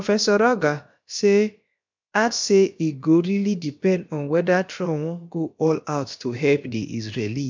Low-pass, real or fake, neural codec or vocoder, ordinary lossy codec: 7.2 kHz; fake; codec, 16 kHz, about 1 kbps, DyCAST, with the encoder's durations; MP3, 64 kbps